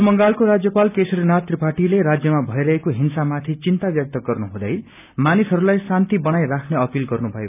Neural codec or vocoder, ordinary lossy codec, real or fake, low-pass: none; none; real; 3.6 kHz